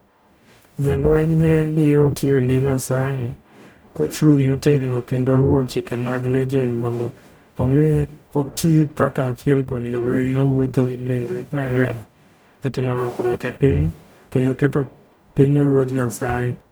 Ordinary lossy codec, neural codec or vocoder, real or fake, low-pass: none; codec, 44.1 kHz, 0.9 kbps, DAC; fake; none